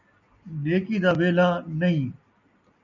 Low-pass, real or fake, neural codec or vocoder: 7.2 kHz; real; none